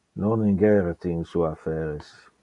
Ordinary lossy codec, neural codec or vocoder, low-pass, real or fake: MP3, 64 kbps; none; 10.8 kHz; real